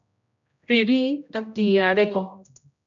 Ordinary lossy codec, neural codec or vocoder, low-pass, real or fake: MP3, 96 kbps; codec, 16 kHz, 0.5 kbps, X-Codec, HuBERT features, trained on general audio; 7.2 kHz; fake